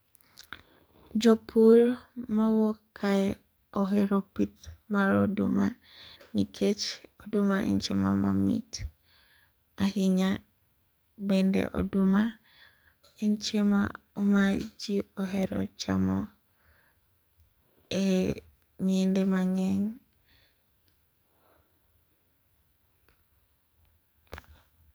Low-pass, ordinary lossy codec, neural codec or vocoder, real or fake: none; none; codec, 44.1 kHz, 2.6 kbps, SNAC; fake